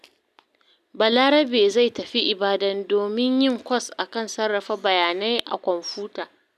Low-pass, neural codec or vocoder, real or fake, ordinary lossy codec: 14.4 kHz; none; real; none